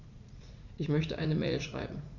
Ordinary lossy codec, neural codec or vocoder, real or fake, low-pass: none; vocoder, 44.1 kHz, 80 mel bands, Vocos; fake; 7.2 kHz